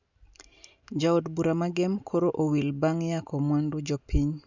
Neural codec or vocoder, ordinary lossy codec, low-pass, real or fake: none; none; 7.2 kHz; real